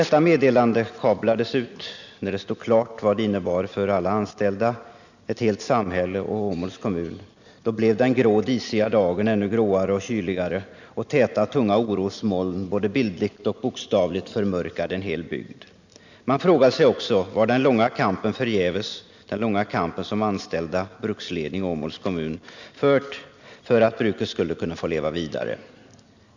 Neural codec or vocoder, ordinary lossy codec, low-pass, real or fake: none; none; 7.2 kHz; real